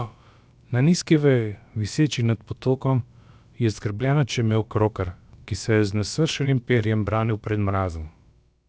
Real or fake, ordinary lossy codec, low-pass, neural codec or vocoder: fake; none; none; codec, 16 kHz, about 1 kbps, DyCAST, with the encoder's durations